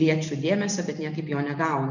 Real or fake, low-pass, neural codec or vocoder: real; 7.2 kHz; none